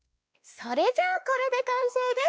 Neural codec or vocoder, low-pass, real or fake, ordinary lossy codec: codec, 16 kHz, 2 kbps, X-Codec, WavLM features, trained on Multilingual LibriSpeech; none; fake; none